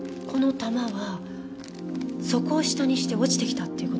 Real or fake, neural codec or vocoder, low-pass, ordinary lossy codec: real; none; none; none